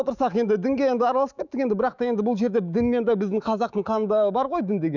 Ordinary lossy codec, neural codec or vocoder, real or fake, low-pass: none; autoencoder, 48 kHz, 128 numbers a frame, DAC-VAE, trained on Japanese speech; fake; 7.2 kHz